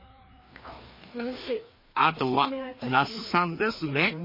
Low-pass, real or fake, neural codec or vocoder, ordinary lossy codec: 5.4 kHz; fake; codec, 16 kHz, 2 kbps, FreqCodec, larger model; MP3, 24 kbps